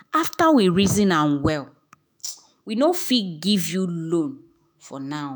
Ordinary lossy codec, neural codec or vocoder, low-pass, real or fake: none; autoencoder, 48 kHz, 128 numbers a frame, DAC-VAE, trained on Japanese speech; none; fake